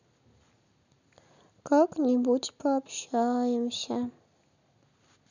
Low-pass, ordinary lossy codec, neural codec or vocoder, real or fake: 7.2 kHz; none; none; real